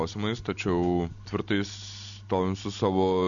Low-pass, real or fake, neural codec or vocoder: 7.2 kHz; real; none